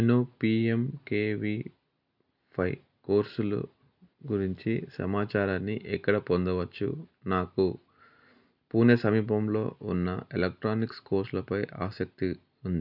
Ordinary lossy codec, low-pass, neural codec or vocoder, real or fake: none; 5.4 kHz; none; real